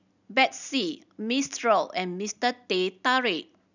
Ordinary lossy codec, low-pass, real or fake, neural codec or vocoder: none; 7.2 kHz; real; none